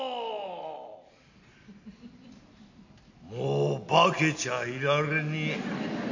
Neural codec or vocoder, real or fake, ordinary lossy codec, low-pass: none; real; AAC, 48 kbps; 7.2 kHz